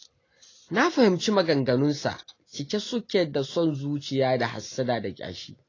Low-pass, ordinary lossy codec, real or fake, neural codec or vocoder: 7.2 kHz; AAC, 32 kbps; real; none